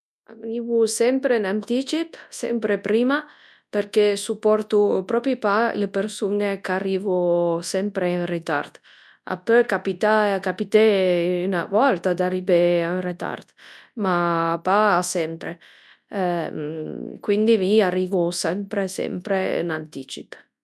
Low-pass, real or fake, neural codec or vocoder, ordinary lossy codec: none; fake; codec, 24 kHz, 0.9 kbps, WavTokenizer, large speech release; none